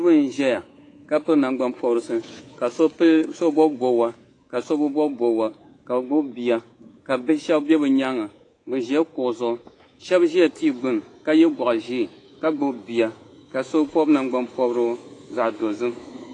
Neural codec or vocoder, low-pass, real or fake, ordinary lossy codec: codec, 24 kHz, 3.1 kbps, DualCodec; 10.8 kHz; fake; AAC, 32 kbps